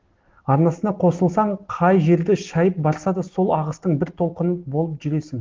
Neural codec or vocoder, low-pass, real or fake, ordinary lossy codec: none; 7.2 kHz; real; Opus, 16 kbps